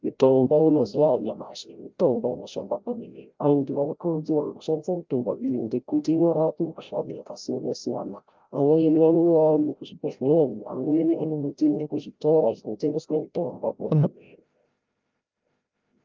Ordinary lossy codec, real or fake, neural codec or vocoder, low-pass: Opus, 32 kbps; fake; codec, 16 kHz, 0.5 kbps, FreqCodec, larger model; 7.2 kHz